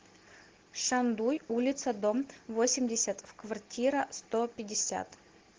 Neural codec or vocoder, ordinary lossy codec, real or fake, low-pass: none; Opus, 16 kbps; real; 7.2 kHz